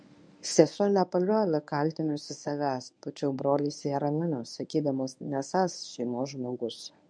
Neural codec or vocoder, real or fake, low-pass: codec, 24 kHz, 0.9 kbps, WavTokenizer, medium speech release version 1; fake; 9.9 kHz